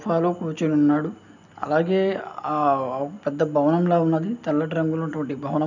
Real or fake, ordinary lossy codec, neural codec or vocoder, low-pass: real; none; none; 7.2 kHz